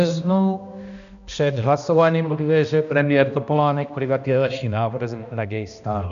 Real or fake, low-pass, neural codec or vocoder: fake; 7.2 kHz; codec, 16 kHz, 1 kbps, X-Codec, HuBERT features, trained on balanced general audio